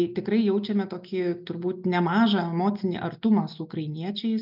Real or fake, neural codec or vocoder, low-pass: real; none; 5.4 kHz